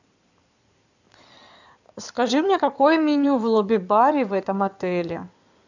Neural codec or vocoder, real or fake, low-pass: codec, 16 kHz in and 24 kHz out, 2.2 kbps, FireRedTTS-2 codec; fake; 7.2 kHz